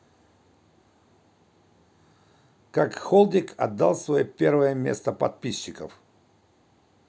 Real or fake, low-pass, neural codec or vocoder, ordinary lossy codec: real; none; none; none